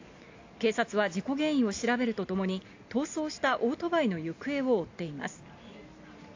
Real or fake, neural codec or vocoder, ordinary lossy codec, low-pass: real; none; AAC, 48 kbps; 7.2 kHz